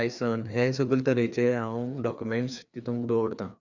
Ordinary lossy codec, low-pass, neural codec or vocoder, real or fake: none; 7.2 kHz; codec, 16 kHz, 2 kbps, FreqCodec, larger model; fake